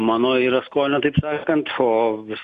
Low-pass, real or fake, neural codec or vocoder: 10.8 kHz; real; none